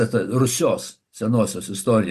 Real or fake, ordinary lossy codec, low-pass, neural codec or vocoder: real; Opus, 64 kbps; 14.4 kHz; none